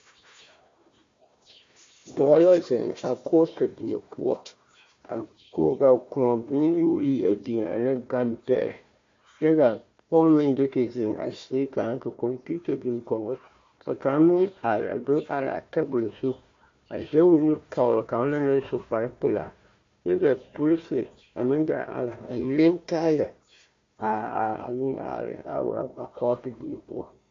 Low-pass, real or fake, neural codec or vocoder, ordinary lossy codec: 7.2 kHz; fake; codec, 16 kHz, 1 kbps, FunCodec, trained on Chinese and English, 50 frames a second; MP3, 48 kbps